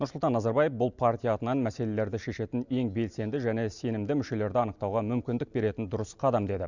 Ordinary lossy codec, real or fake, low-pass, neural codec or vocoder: none; real; 7.2 kHz; none